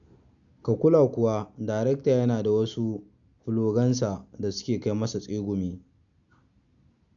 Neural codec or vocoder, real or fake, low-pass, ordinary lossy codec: none; real; 7.2 kHz; none